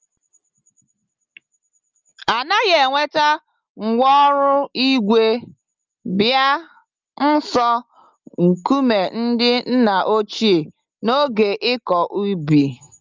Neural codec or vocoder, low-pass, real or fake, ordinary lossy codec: none; 7.2 kHz; real; Opus, 24 kbps